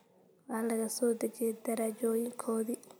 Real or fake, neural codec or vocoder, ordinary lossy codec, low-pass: fake; vocoder, 44.1 kHz, 128 mel bands every 256 samples, BigVGAN v2; none; none